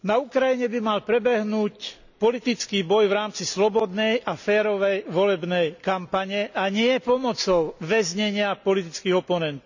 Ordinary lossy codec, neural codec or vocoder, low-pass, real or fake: none; none; 7.2 kHz; real